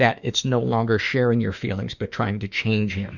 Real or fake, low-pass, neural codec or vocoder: fake; 7.2 kHz; autoencoder, 48 kHz, 32 numbers a frame, DAC-VAE, trained on Japanese speech